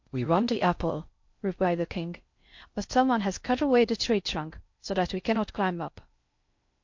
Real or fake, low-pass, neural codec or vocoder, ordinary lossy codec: fake; 7.2 kHz; codec, 16 kHz in and 24 kHz out, 0.6 kbps, FocalCodec, streaming, 2048 codes; MP3, 48 kbps